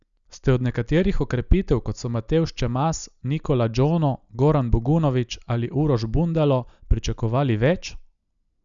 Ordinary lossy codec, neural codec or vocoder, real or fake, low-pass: none; none; real; 7.2 kHz